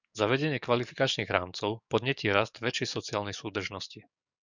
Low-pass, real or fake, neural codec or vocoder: 7.2 kHz; fake; codec, 44.1 kHz, 7.8 kbps, DAC